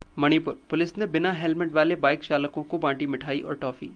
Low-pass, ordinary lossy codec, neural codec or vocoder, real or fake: 9.9 kHz; Opus, 32 kbps; none; real